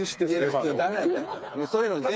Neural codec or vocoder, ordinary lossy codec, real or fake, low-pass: codec, 16 kHz, 4 kbps, FreqCodec, smaller model; none; fake; none